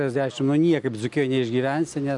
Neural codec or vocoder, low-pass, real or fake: none; 10.8 kHz; real